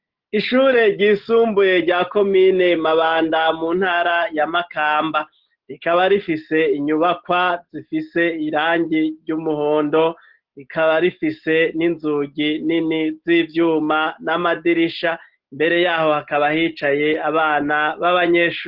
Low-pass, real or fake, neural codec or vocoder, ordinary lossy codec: 5.4 kHz; real; none; Opus, 16 kbps